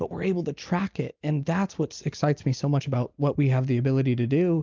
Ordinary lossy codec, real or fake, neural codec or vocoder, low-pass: Opus, 24 kbps; real; none; 7.2 kHz